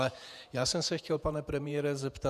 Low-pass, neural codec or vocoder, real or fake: 14.4 kHz; vocoder, 48 kHz, 128 mel bands, Vocos; fake